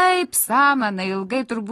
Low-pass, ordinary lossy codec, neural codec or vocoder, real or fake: 19.8 kHz; AAC, 32 kbps; none; real